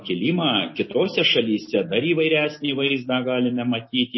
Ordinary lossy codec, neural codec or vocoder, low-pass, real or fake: MP3, 24 kbps; none; 7.2 kHz; real